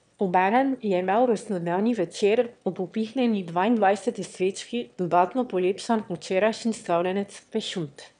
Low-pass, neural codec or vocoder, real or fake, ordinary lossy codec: 9.9 kHz; autoencoder, 22.05 kHz, a latent of 192 numbers a frame, VITS, trained on one speaker; fake; none